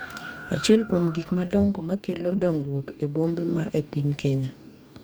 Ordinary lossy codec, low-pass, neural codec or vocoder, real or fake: none; none; codec, 44.1 kHz, 2.6 kbps, DAC; fake